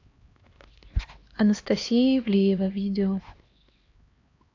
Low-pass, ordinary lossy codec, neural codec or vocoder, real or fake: 7.2 kHz; AAC, 48 kbps; codec, 16 kHz, 2 kbps, X-Codec, HuBERT features, trained on LibriSpeech; fake